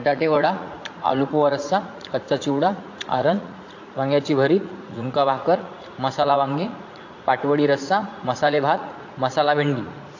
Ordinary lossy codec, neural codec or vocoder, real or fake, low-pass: AAC, 48 kbps; vocoder, 44.1 kHz, 80 mel bands, Vocos; fake; 7.2 kHz